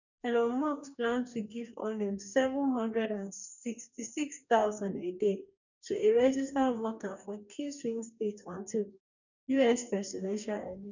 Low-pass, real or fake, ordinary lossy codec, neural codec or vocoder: 7.2 kHz; fake; none; codec, 44.1 kHz, 2.6 kbps, DAC